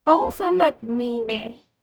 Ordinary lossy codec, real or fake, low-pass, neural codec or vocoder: none; fake; none; codec, 44.1 kHz, 0.9 kbps, DAC